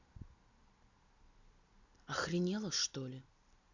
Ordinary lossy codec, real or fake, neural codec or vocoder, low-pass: none; real; none; 7.2 kHz